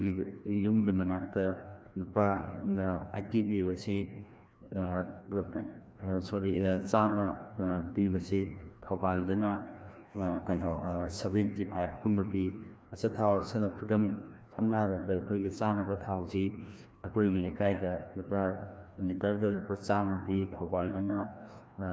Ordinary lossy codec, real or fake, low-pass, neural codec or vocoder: none; fake; none; codec, 16 kHz, 1 kbps, FreqCodec, larger model